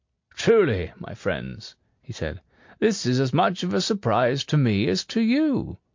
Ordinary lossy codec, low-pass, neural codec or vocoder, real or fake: MP3, 48 kbps; 7.2 kHz; none; real